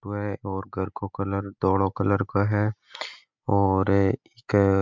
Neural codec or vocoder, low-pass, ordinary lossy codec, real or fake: none; 7.2 kHz; MP3, 64 kbps; real